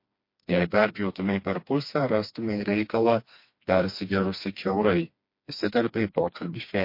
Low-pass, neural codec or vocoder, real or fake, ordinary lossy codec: 5.4 kHz; codec, 16 kHz, 2 kbps, FreqCodec, smaller model; fake; MP3, 32 kbps